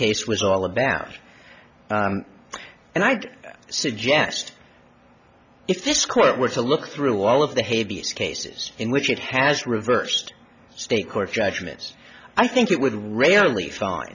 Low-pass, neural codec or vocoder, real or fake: 7.2 kHz; none; real